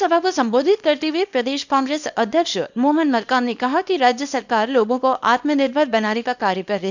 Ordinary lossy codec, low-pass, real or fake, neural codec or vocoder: none; 7.2 kHz; fake; codec, 24 kHz, 0.9 kbps, WavTokenizer, small release